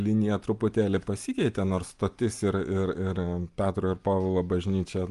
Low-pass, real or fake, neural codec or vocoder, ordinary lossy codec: 10.8 kHz; real; none; Opus, 32 kbps